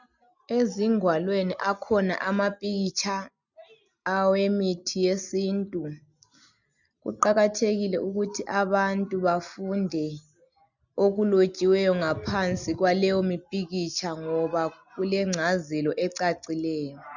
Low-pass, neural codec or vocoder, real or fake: 7.2 kHz; none; real